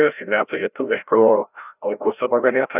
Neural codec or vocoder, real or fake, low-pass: codec, 16 kHz, 0.5 kbps, FreqCodec, larger model; fake; 3.6 kHz